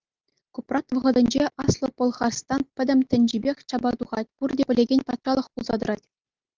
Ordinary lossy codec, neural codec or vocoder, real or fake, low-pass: Opus, 32 kbps; none; real; 7.2 kHz